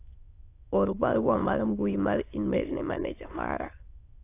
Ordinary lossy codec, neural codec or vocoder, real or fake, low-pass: AAC, 24 kbps; autoencoder, 22.05 kHz, a latent of 192 numbers a frame, VITS, trained on many speakers; fake; 3.6 kHz